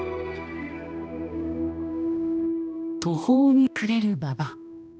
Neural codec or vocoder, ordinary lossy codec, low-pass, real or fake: codec, 16 kHz, 1 kbps, X-Codec, HuBERT features, trained on balanced general audio; none; none; fake